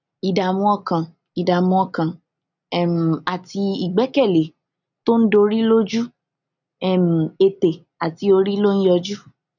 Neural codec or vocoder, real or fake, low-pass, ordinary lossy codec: none; real; 7.2 kHz; none